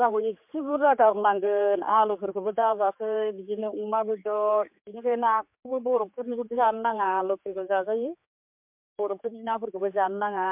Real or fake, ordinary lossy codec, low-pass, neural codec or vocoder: fake; MP3, 32 kbps; 3.6 kHz; codec, 16 kHz, 4 kbps, X-Codec, HuBERT features, trained on general audio